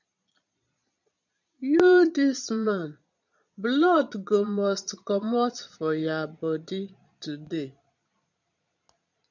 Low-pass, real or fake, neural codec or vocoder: 7.2 kHz; fake; vocoder, 22.05 kHz, 80 mel bands, Vocos